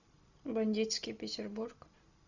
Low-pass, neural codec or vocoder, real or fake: 7.2 kHz; none; real